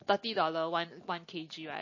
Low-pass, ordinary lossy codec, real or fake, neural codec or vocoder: 7.2 kHz; MP3, 32 kbps; real; none